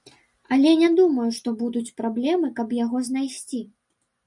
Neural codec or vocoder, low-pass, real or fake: none; 10.8 kHz; real